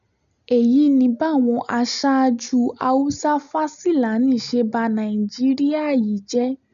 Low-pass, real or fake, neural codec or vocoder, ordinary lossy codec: 7.2 kHz; real; none; none